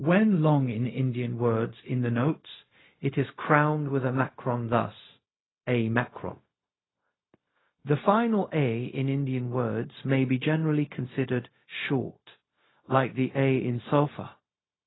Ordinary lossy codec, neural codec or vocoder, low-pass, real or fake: AAC, 16 kbps; codec, 16 kHz, 0.4 kbps, LongCat-Audio-Codec; 7.2 kHz; fake